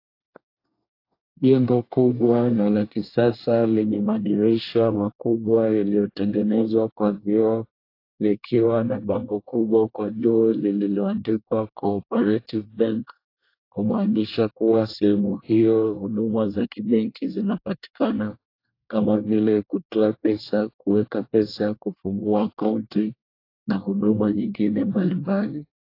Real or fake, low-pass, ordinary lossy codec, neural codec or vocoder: fake; 5.4 kHz; AAC, 32 kbps; codec, 24 kHz, 1 kbps, SNAC